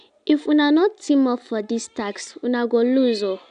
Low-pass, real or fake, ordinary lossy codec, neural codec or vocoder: 9.9 kHz; real; none; none